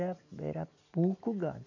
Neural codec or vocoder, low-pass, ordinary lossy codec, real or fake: none; 7.2 kHz; none; real